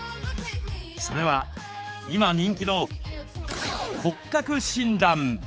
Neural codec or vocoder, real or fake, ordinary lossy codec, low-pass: codec, 16 kHz, 4 kbps, X-Codec, HuBERT features, trained on general audio; fake; none; none